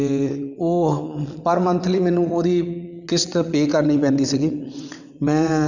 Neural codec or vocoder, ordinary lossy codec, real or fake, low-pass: vocoder, 22.05 kHz, 80 mel bands, WaveNeXt; none; fake; 7.2 kHz